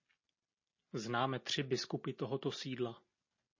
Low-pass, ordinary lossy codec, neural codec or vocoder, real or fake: 7.2 kHz; MP3, 32 kbps; none; real